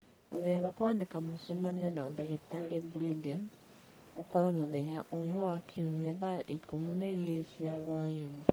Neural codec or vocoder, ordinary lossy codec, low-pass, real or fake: codec, 44.1 kHz, 1.7 kbps, Pupu-Codec; none; none; fake